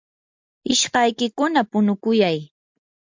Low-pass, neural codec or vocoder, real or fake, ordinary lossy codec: 7.2 kHz; none; real; MP3, 64 kbps